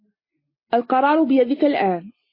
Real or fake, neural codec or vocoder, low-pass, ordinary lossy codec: real; none; 5.4 kHz; MP3, 24 kbps